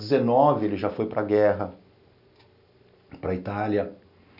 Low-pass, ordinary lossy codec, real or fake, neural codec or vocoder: 5.4 kHz; none; real; none